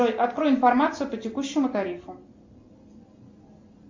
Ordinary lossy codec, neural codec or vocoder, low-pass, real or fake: MP3, 64 kbps; none; 7.2 kHz; real